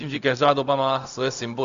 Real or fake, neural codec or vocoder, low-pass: fake; codec, 16 kHz, 0.4 kbps, LongCat-Audio-Codec; 7.2 kHz